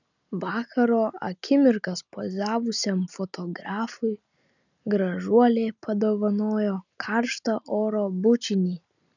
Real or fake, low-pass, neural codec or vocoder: real; 7.2 kHz; none